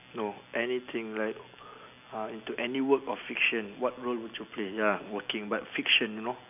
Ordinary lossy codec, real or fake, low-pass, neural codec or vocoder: none; real; 3.6 kHz; none